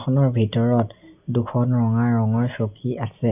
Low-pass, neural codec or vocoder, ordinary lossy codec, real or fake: 3.6 kHz; none; none; real